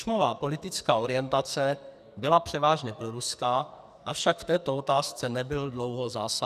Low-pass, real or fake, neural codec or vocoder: 14.4 kHz; fake; codec, 44.1 kHz, 2.6 kbps, SNAC